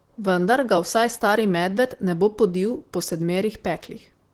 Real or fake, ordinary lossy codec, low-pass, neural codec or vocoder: fake; Opus, 16 kbps; 19.8 kHz; vocoder, 44.1 kHz, 128 mel bands, Pupu-Vocoder